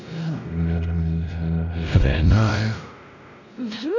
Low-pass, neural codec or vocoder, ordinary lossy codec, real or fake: 7.2 kHz; codec, 16 kHz, 0.5 kbps, X-Codec, WavLM features, trained on Multilingual LibriSpeech; none; fake